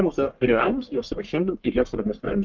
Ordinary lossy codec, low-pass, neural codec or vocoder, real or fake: Opus, 32 kbps; 7.2 kHz; codec, 44.1 kHz, 1.7 kbps, Pupu-Codec; fake